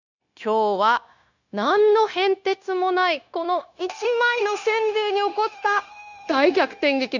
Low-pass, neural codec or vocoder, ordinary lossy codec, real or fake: 7.2 kHz; codec, 16 kHz, 0.9 kbps, LongCat-Audio-Codec; none; fake